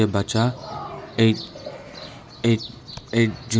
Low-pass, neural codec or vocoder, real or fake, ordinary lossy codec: none; none; real; none